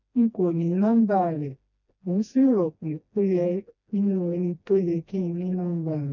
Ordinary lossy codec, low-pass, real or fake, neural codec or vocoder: none; 7.2 kHz; fake; codec, 16 kHz, 1 kbps, FreqCodec, smaller model